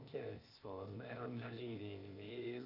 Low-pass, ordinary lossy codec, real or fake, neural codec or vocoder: 5.4 kHz; none; fake; codec, 16 kHz, 0.8 kbps, ZipCodec